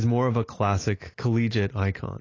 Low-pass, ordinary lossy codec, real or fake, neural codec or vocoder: 7.2 kHz; AAC, 32 kbps; real; none